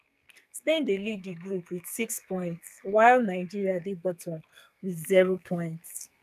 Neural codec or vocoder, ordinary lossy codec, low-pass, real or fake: codec, 44.1 kHz, 2.6 kbps, SNAC; none; 14.4 kHz; fake